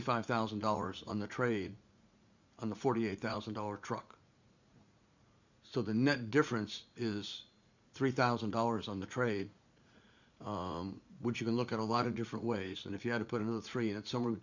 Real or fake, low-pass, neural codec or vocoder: fake; 7.2 kHz; vocoder, 44.1 kHz, 80 mel bands, Vocos